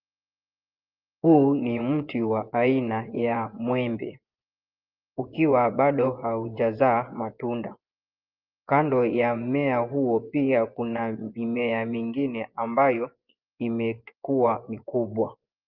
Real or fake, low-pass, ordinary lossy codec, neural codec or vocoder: fake; 5.4 kHz; Opus, 32 kbps; vocoder, 24 kHz, 100 mel bands, Vocos